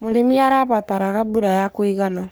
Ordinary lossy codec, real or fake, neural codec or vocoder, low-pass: none; fake; codec, 44.1 kHz, 3.4 kbps, Pupu-Codec; none